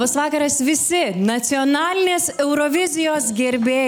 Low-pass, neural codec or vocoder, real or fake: 19.8 kHz; none; real